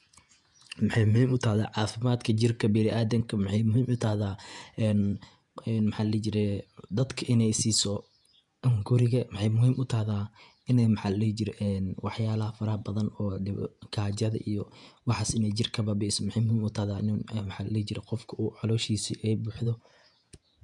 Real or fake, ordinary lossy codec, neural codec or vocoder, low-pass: fake; none; vocoder, 48 kHz, 128 mel bands, Vocos; 10.8 kHz